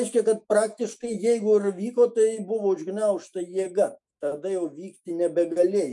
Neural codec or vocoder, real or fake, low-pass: autoencoder, 48 kHz, 128 numbers a frame, DAC-VAE, trained on Japanese speech; fake; 10.8 kHz